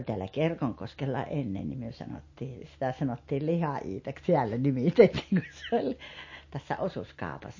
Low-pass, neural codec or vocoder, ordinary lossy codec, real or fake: 7.2 kHz; none; MP3, 32 kbps; real